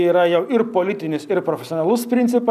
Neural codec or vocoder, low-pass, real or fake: none; 14.4 kHz; real